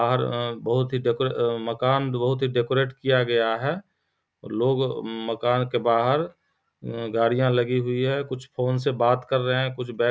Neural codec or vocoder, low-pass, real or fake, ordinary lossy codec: none; none; real; none